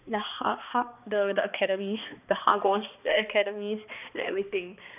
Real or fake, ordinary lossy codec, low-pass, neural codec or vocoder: fake; none; 3.6 kHz; codec, 16 kHz, 2 kbps, X-Codec, HuBERT features, trained on balanced general audio